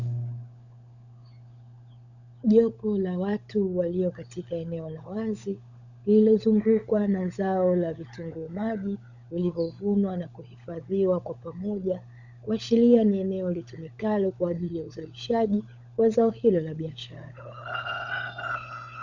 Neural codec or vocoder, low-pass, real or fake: codec, 16 kHz, 16 kbps, FunCodec, trained on LibriTTS, 50 frames a second; 7.2 kHz; fake